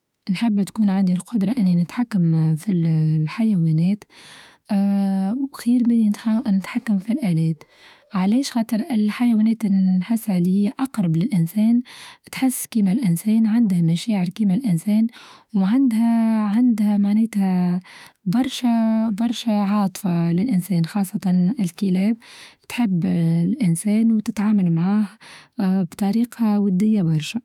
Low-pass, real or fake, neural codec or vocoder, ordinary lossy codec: 19.8 kHz; fake; autoencoder, 48 kHz, 32 numbers a frame, DAC-VAE, trained on Japanese speech; none